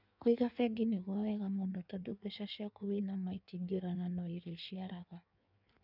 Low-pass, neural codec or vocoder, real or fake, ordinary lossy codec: 5.4 kHz; codec, 16 kHz in and 24 kHz out, 1.1 kbps, FireRedTTS-2 codec; fake; none